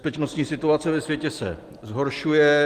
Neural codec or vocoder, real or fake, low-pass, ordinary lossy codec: none; real; 14.4 kHz; Opus, 24 kbps